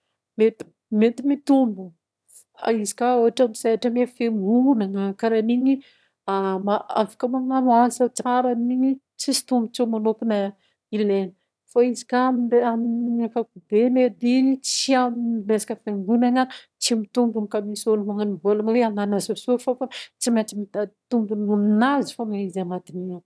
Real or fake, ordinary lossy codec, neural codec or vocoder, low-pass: fake; none; autoencoder, 22.05 kHz, a latent of 192 numbers a frame, VITS, trained on one speaker; none